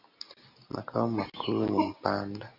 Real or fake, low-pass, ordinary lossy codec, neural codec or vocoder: real; 5.4 kHz; AAC, 32 kbps; none